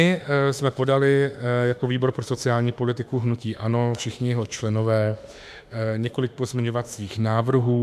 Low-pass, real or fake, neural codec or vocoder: 14.4 kHz; fake; autoencoder, 48 kHz, 32 numbers a frame, DAC-VAE, trained on Japanese speech